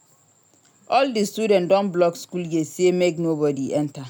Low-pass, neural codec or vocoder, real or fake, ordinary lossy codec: none; none; real; none